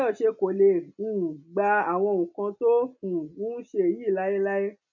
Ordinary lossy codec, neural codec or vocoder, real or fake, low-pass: none; none; real; 7.2 kHz